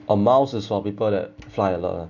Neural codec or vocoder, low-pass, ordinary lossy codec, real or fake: none; 7.2 kHz; none; real